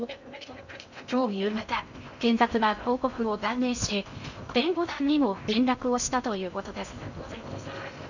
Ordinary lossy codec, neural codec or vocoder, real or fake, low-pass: none; codec, 16 kHz in and 24 kHz out, 0.6 kbps, FocalCodec, streaming, 2048 codes; fake; 7.2 kHz